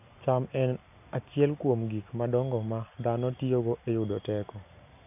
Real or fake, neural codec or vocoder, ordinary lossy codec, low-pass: real; none; none; 3.6 kHz